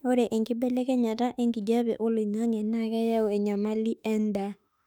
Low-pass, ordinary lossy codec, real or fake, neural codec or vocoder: 19.8 kHz; none; fake; autoencoder, 48 kHz, 32 numbers a frame, DAC-VAE, trained on Japanese speech